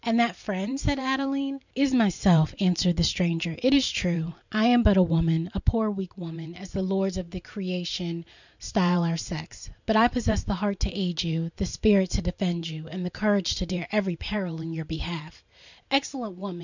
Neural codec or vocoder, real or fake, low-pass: vocoder, 44.1 kHz, 128 mel bands every 512 samples, BigVGAN v2; fake; 7.2 kHz